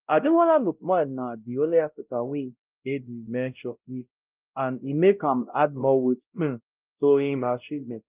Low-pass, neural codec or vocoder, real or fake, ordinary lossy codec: 3.6 kHz; codec, 16 kHz, 0.5 kbps, X-Codec, WavLM features, trained on Multilingual LibriSpeech; fake; Opus, 32 kbps